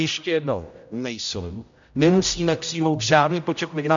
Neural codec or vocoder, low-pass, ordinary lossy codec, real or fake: codec, 16 kHz, 0.5 kbps, X-Codec, HuBERT features, trained on general audio; 7.2 kHz; MP3, 64 kbps; fake